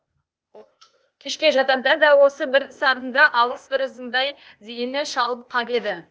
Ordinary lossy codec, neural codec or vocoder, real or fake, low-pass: none; codec, 16 kHz, 0.8 kbps, ZipCodec; fake; none